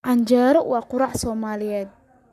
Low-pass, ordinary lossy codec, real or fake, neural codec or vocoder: 14.4 kHz; none; fake; codec, 44.1 kHz, 7.8 kbps, Pupu-Codec